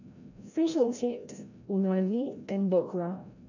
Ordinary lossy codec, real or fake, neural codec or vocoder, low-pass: none; fake; codec, 16 kHz, 0.5 kbps, FreqCodec, larger model; 7.2 kHz